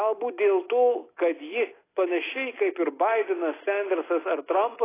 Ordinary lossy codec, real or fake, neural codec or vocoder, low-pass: AAC, 16 kbps; real; none; 3.6 kHz